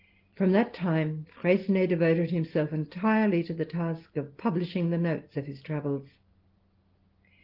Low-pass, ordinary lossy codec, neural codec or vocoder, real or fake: 5.4 kHz; Opus, 16 kbps; none; real